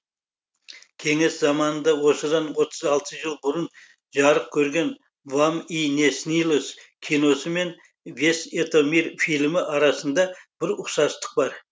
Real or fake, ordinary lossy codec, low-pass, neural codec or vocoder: real; none; none; none